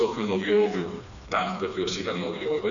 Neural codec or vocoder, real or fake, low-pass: codec, 16 kHz, 2 kbps, FreqCodec, smaller model; fake; 7.2 kHz